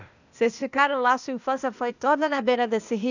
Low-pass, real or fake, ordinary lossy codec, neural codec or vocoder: 7.2 kHz; fake; none; codec, 16 kHz, 0.8 kbps, ZipCodec